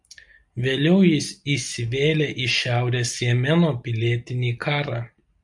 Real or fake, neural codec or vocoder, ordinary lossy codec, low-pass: real; none; MP3, 64 kbps; 10.8 kHz